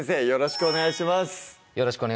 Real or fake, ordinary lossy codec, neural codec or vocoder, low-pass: real; none; none; none